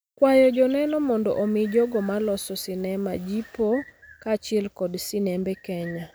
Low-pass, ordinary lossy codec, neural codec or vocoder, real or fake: none; none; none; real